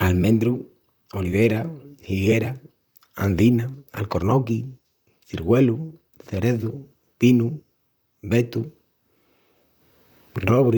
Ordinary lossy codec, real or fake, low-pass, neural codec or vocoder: none; fake; none; vocoder, 44.1 kHz, 128 mel bands, Pupu-Vocoder